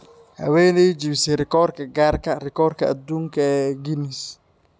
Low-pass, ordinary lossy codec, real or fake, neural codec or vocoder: none; none; real; none